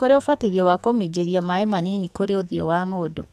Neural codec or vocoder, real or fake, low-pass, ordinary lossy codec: codec, 44.1 kHz, 2.6 kbps, SNAC; fake; 14.4 kHz; none